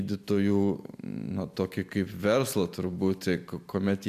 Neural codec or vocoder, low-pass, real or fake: none; 14.4 kHz; real